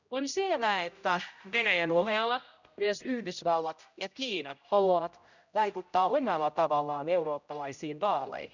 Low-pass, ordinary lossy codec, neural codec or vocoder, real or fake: 7.2 kHz; none; codec, 16 kHz, 0.5 kbps, X-Codec, HuBERT features, trained on general audio; fake